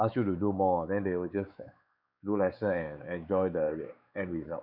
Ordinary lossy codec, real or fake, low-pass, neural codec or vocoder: none; fake; 5.4 kHz; codec, 16 kHz, 4 kbps, X-Codec, WavLM features, trained on Multilingual LibriSpeech